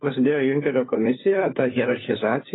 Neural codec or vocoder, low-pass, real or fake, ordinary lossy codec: codec, 16 kHz, 4 kbps, FunCodec, trained on LibriTTS, 50 frames a second; 7.2 kHz; fake; AAC, 16 kbps